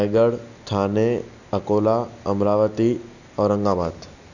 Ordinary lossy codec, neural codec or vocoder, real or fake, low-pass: none; none; real; 7.2 kHz